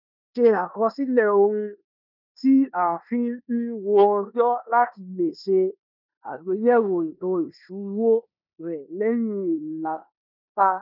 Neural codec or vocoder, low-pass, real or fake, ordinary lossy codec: codec, 16 kHz in and 24 kHz out, 0.9 kbps, LongCat-Audio-Codec, four codebook decoder; 5.4 kHz; fake; none